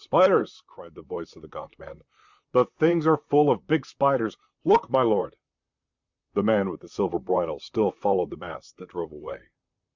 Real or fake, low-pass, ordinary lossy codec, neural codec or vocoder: fake; 7.2 kHz; Opus, 64 kbps; vocoder, 44.1 kHz, 128 mel bands, Pupu-Vocoder